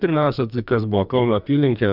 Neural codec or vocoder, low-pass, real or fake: codec, 44.1 kHz, 2.6 kbps, SNAC; 5.4 kHz; fake